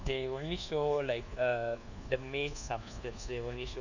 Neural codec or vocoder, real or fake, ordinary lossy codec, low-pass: codec, 24 kHz, 1.2 kbps, DualCodec; fake; none; 7.2 kHz